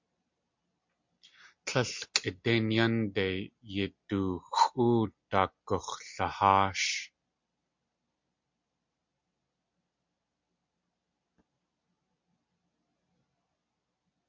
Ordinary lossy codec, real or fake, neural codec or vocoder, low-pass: MP3, 48 kbps; real; none; 7.2 kHz